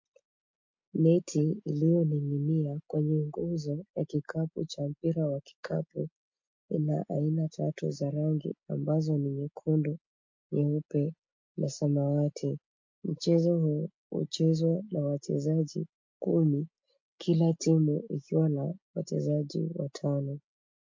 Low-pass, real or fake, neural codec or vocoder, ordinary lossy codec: 7.2 kHz; real; none; MP3, 48 kbps